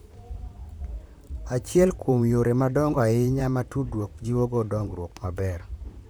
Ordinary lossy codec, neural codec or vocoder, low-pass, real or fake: none; vocoder, 44.1 kHz, 128 mel bands, Pupu-Vocoder; none; fake